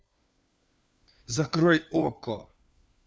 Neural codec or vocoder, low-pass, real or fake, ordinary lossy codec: codec, 16 kHz, 4 kbps, FunCodec, trained on LibriTTS, 50 frames a second; none; fake; none